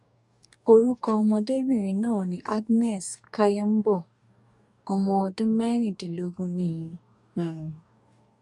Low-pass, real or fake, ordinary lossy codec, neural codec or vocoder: 10.8 kHz; fake; none; codec, 44.1 kHz, 2.6 kbps, DAC